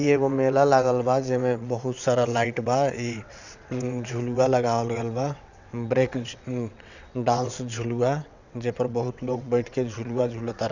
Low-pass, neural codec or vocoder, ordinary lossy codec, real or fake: 7.2 kHz; vocoder, 22.05 kHz, 80 mel bands, WaveNeXt; none; fake